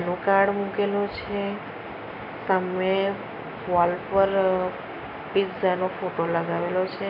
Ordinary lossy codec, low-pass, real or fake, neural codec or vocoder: none; 5.4 kHz; real; none